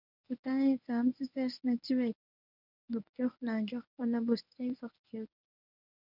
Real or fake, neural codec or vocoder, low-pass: fake; codec, 24 kHz, 0.9 kbps, WavTokenizer, medium speech release version 1; 5.4 kHz